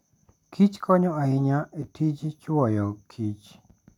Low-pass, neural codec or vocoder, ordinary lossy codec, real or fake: 19.8 kHz; none; none; real